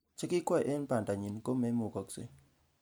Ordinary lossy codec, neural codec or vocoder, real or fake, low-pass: none; none; real; none